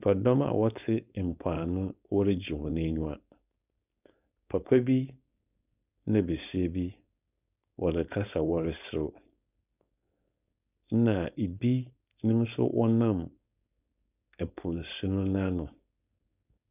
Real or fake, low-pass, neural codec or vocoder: fake; 3.6 kHz; codec, 16 kHz, 4.8 kbps, FACodec